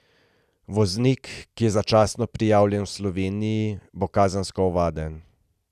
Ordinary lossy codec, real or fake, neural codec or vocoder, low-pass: none; real; none; 14.4 kHz